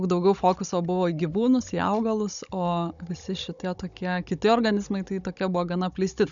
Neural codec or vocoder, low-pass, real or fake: codec, 16 kHz, 16 kbps, FunCodec, trained on Chinese and English, 50 frames a second; 7.2 kHz; fake